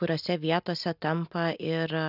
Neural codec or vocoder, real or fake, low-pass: none; real; 5.4 kHz